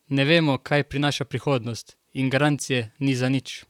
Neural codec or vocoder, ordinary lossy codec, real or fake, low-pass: vocoder, 44.1 kHz, 128 mel bands, Pupu-Vocoder; none; fake; 19.8 kHz